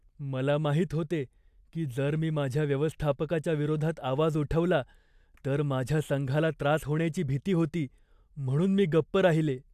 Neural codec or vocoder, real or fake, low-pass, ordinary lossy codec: none; real; 14.4 kHz; AAC, 96 kbps